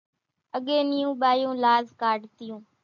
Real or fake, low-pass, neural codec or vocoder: real; 7.2 kHz; none